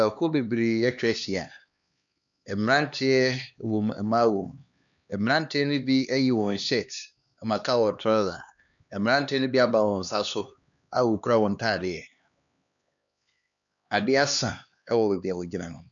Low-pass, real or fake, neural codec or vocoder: 7.2 kHz; fake; codec, 16 kHz, 2 kbps, X-Codec, HuBERT features, trained on LibriSpeech